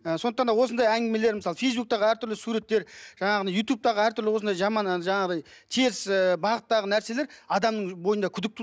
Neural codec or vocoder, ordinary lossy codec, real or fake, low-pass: none; none; real; none